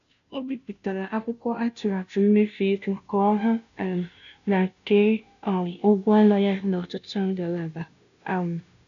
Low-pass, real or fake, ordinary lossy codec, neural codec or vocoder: 7.2 kHz; fake; none; codec, 16 kHz, 0.5 kbps, FunCodec, trained on Chinese and English, 25 frames a second